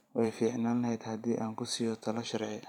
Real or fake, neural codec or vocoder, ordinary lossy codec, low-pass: fake; vocoder, 44.1 kHz, 128 mel bands every 512 samples, BigVGAN v2; none; 19.8 kHz